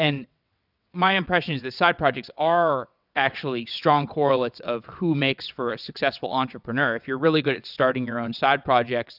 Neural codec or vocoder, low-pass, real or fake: vocoder, 22.05 kHz, 80 mel bands, WaveNeXt; 5.4 kHz; fake